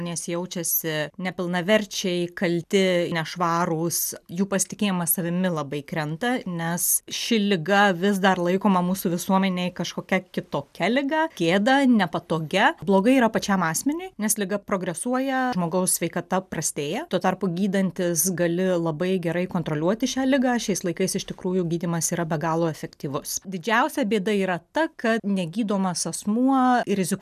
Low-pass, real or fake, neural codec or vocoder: 14.4 kHz; real; none